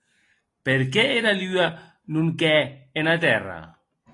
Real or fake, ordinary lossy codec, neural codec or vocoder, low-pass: real; AAC, 32 kbps; none; 10.8 kHz